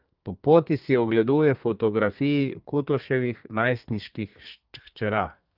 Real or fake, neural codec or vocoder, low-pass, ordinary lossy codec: fake; codec, 32 kHz, 1.9 kbps, SNAC; 5.4 kHz; Opus, 32 kbps